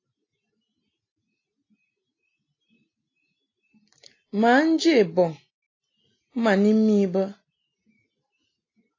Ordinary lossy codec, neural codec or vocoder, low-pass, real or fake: AAC, 32 kbps; none; 7.2 kHz; real